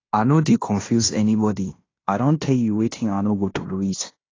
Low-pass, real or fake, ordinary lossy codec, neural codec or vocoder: 7.2 kHz; fake; AAC, 32 kbps; codec, 16 kHz in and 24 kHz out, 0.9 kbps, LongCat-Audio-Codec, fine tuned four codebook decoder